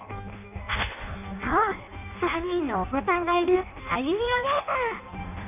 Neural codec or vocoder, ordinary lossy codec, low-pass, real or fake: codec, 16 kHz in and 24 kHz out, 0.6 kbps, FireRedTTS-2 codec; none; 3.6 kHz; fake